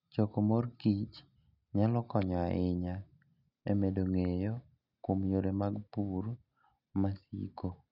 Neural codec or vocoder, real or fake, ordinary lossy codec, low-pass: none; real; none; 5.4 kHz